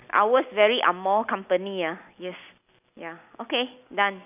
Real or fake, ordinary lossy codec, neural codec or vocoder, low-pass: real; none; none; 3.6 kHz